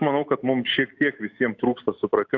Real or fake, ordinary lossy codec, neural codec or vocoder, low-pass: fake; AAC, 48 kbps; autoencoder, 48 kHz, 128 numbers a frame, DAC-VAE, trained on Japanese speech; 7.2 kHz